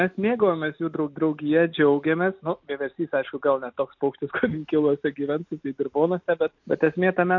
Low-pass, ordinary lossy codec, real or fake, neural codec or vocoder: 7.2 kHz; MP3, 48 kbps; real; none